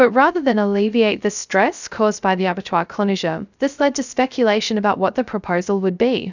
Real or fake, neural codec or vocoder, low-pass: fake; codec, 16 kHz, 0.3 kbps, FocalCodec; 7.2 kHz